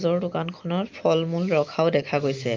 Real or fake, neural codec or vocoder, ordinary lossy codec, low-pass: real; none; Opus, 32 kbps; 7.2 kHz